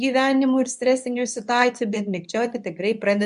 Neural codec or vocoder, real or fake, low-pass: codec, 24 kHz, 0.9 kbps, WavTokenizer, medium speech release version 2; fake; 10.8 kHz